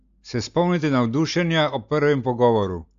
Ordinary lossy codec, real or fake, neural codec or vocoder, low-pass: AAC, 64 kbps; real; none; 7.2 kHz